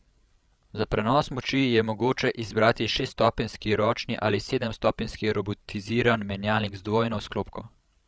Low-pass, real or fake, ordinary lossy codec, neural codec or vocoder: none; fake; none; codec, 16 kHz, 16 kbps, FreqCodec, larger model